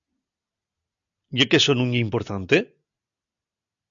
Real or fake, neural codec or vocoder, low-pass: real; none; 7.2 kHz